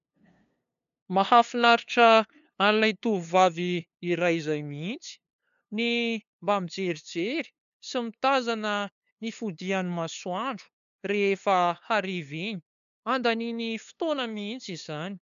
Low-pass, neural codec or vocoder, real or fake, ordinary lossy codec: 7.2 kHz; codec, 16 kHz, 2 kbps, FunCodec, trained on LibriTTS, 25 frames a second; fake; AAC, 96 kbps